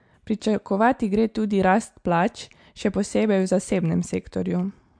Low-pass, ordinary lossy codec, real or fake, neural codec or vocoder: 9.9 kHz; MP3, 64 kbps; real; none